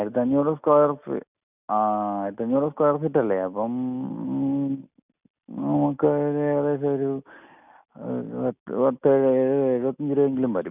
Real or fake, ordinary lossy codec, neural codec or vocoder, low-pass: real; none; none; 3.6 kHz